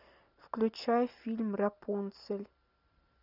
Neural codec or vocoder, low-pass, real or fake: none; 5.4 kHz; real